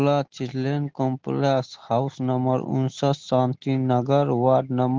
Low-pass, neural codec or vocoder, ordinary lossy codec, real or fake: 7.2 kHz; none; Opus, 16 kbps; real